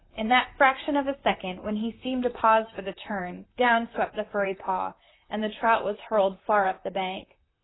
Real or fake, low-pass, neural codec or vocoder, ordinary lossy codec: fake; 7.2 kHz; vocoder, 44.1 kHz, 128 mel bands, Pupu-Vocoder; AAC, 16 kbps